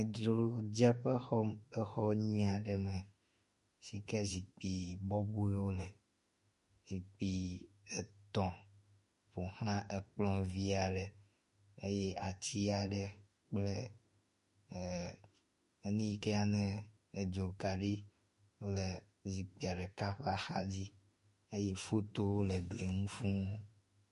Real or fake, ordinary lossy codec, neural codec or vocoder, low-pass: fake; MP3, 48 kbps; autoencoder, 48 kHz, 32 numbers a frame, DAC-VAE, trained on Japanese speech; 14.4 kHz